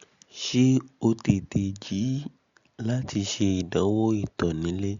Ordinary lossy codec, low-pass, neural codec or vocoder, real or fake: Opus, 64 kbps; 7.2 kHz; none; real